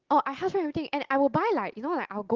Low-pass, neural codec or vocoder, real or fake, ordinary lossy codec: 7.2 kHz; none; real; Opus, 16 kbps